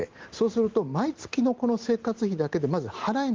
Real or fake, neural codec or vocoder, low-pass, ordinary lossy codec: real; none; 7.2 kHz; Opus, 16 kbps